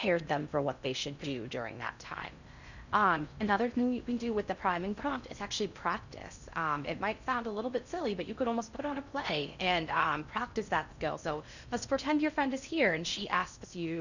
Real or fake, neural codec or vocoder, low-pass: fake; codec, 16 kHz in and 24 kHz out, 0.6 kbps, FocalCodec, streaming, 4096 codes; 7.2 kHz